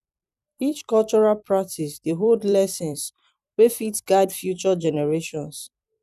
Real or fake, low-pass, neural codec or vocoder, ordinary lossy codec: fake; 14.4 kHz; vocoder, 44.1 kHz, 128 mel bands every 256 samples, BigVGAN v2; none